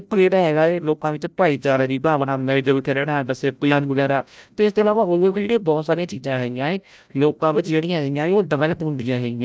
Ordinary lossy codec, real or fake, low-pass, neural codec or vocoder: none; fake; none; codec, 16 kHz, 0.5 kbps, FreqCodec, larger model